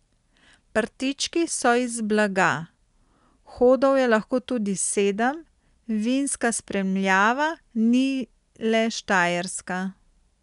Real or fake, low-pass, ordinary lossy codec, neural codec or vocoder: real; 10.8 kHz; none; none